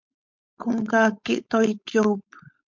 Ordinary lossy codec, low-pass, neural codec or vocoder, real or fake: MP3, 48 kbps; 7.2 kHz; codec, 16 kHz, 4.8 kbps, FACodec; fake